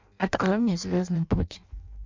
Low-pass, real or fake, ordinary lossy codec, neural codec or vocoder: 7.2 kHz; fake; MP3, 64 kbps; codec, 16 kHz in and 24 kHz out, 0.6 kbps, FireRedTTS-2 codec